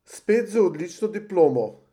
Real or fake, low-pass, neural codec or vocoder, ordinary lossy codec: real; 19.8 kHz; none; none